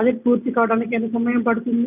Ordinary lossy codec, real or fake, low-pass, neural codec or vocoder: none; real; 3.6 kHz; none